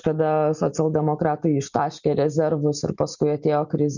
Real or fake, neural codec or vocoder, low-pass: real; none; 7.2 kHz